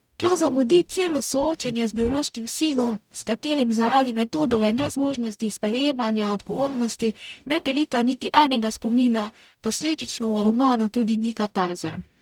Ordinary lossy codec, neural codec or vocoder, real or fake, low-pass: none; codec, 44.1 kHz, 0.9 kbps, DAC; fake; 19.8 kHz